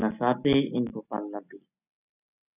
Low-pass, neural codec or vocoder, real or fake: 3.6 kHz; none; real